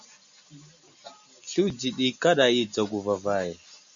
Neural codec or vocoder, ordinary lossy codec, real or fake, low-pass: none; MP3, 64 kbps; real; 7.2 kHz